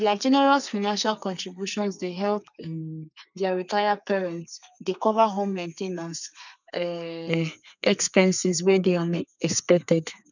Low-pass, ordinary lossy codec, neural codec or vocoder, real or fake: 7.2 kHz; none; codec, 32 kHz, 1.9 kbps, SNAC; fake